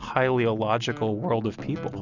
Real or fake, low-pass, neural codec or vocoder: real; 7.2 kHz; none